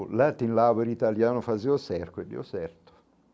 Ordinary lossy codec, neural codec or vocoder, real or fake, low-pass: none; none; real; none